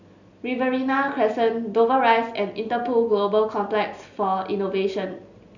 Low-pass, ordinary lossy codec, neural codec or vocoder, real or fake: 7.2 kHz; none; none; real